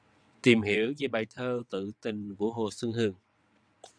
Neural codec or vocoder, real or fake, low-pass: vocoder, 22.05 kHz, 80 mel bands, WaveNeXt; fake; 9.9 kHz